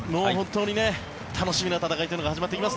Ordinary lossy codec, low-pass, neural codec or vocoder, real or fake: none; none; none; real